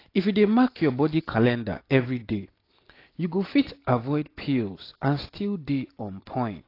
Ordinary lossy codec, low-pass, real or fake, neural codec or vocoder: AAC, 32 kbps; 5.4 kHz; real; none